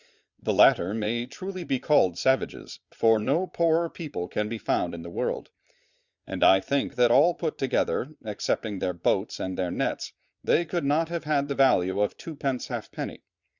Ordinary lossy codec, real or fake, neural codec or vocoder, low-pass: Opus, 64 kbps; fake; vocoder, 22.05 kHz, 80 mel bands, Vocos; 7.2 kHz